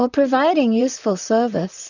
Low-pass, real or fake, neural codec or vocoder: 7.2 kHz; fake; vocoder, 44.1 kHz, 128 mel bands, Pupu-Vocoder